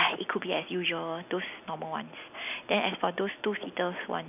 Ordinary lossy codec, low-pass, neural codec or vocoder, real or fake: none; 3.6 kHz; none; real